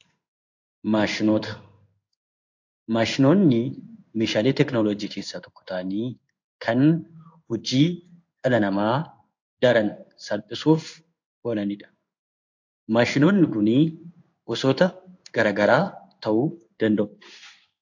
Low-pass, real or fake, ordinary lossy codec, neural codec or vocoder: 7.2 kHz; fake; AAC, 48 kbps; codec, 16 kHz in and 24 kHz out, 1 kbps, XY-Tokenizer